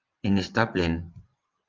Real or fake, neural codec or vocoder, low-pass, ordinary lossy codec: fake; vocoder, 22.05 kHz, 80 mel bands, WaveNeXt; 7.2 kHz; Opus, 32 kbps